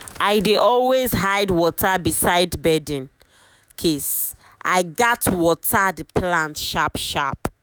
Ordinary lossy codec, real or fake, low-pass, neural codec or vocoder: none; fake; none; autoencoder, 48 kHz, 128 numbers a frame, DAC-VAE, trained on Japanese speech